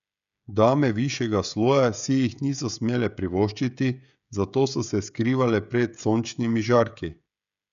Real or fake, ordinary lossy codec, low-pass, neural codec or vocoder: fake; none; 7.2 kHz; codec, 16 kHz, 16 kbps, FreqCodec, smaller model